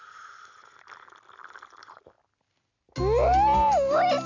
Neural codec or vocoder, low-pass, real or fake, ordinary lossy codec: none; 7.2 kHz; real; none